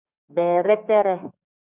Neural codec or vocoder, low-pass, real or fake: codec, 32 kHz, 1.9 kbps, SNAC; 3.6 kHz; fake